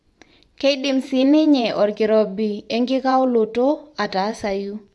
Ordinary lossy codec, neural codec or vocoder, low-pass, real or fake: none; vocoder, 24 kHz, 100 mel bands, Vocos; none; fake